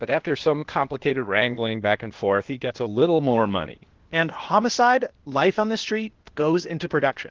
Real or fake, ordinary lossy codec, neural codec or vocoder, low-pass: fake; Opus, 16 kbps; codec, 16 kHz, 0.8 kbps, ZipCodec; 7.2 kHz